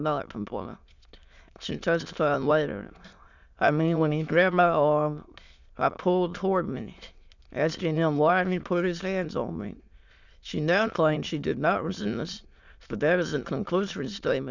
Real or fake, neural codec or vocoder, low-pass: fake; autoencoder, 22.05 kHz, a latent of 192 numbers a frame, VITS, trained on many speakers; 7.2 kHz